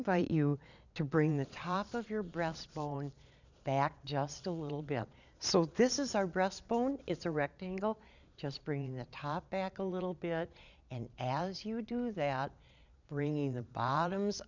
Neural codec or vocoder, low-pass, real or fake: vocoder, 22.05 kHz, 80 mel bands, Vocos; 7.2 kHz; fake